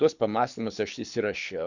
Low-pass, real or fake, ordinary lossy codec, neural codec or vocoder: 7.2 kHz; fake; Opus, 64 kbps; codec, 24 kHz, 6 kbps, HILCodec